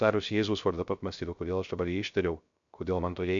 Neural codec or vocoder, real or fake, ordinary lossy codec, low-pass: codec, 16 kHz, 0.3 kbps, FocalCodec; fake; MP3, 64 kbps; 7.2 kHz